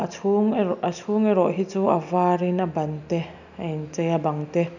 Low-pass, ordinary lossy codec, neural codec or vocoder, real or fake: 7.2 kHz; none; none; real